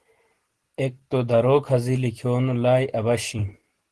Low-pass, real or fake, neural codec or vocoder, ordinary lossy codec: 10.8 kHz; real; none; Opus, 16 kbps